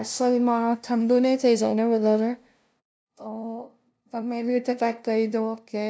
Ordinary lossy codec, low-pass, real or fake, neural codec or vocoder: none; none; fake; codec, 16 kHz, 0.5 kbps, FunCodec, trained on LibriTTS, 25 frames a second